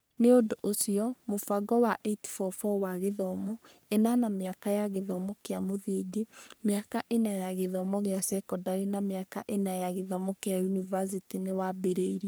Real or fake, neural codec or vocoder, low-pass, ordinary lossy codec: fake; codec, 44.1 kHz, 3.4 kbps, Pupu-Codec; none; none